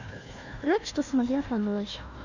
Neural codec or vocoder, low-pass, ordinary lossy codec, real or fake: codec, 16 kHz, 1 kbps, FunCodec, trained on Chinese and English, 50 frames a second; 7.2 kHz; none; fake